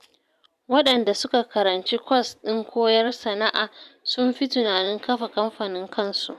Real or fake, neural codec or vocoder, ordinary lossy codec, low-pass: fake; vocoder, 44.1 kHz, 128 mel bands every 512 samples, BigVGAN v2; none; 14.4 kHz